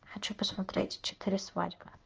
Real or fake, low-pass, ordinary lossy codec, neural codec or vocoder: fake; 7.2 kHz; Opus, 24 kbps; codec, 16 kHz in and 24 kHz out, 1 kbps, XY-Tokenizer